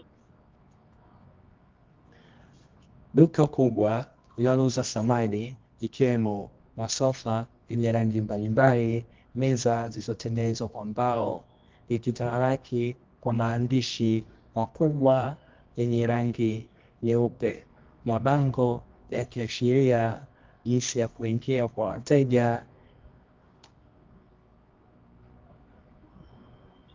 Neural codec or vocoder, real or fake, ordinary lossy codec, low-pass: codec, 24 kHz, 0.9 kbps, WavTokenizer, medium music audio release; fake; Opus, 24 kbps; 7.2 kHz